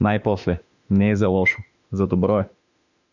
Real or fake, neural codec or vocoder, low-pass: fake; autoencoder, 48 kHz, 32 numbers a frame, DAC-VAE, trained on Japanese speech; 7.2 kHz